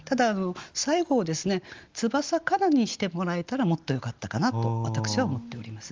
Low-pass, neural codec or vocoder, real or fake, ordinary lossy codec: 7.2 kHz; none; real; Opus, 32 kbps